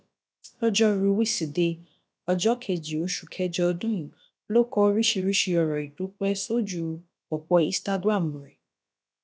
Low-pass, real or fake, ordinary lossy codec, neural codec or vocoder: none; fake; none; codec, 16 kHz, about 1 kbps, DyCAST, with the encoder's durations